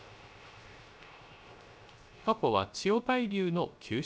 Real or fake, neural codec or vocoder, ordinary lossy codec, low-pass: fake; codec, 16 kHz, 0.3 kbps, FocalCodec; none; none